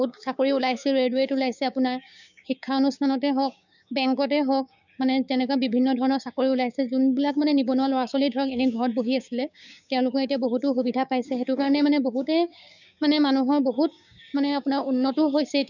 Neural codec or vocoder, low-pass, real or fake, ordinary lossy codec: codec, 16 kHz, 6 kbps, DAC; 7.2 kHz; fake; none